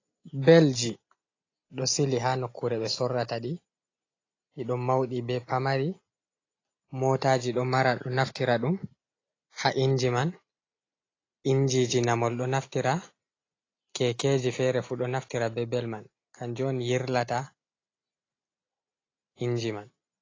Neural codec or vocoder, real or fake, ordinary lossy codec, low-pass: none; real; AAC, 32 kbps; 7.2 kHz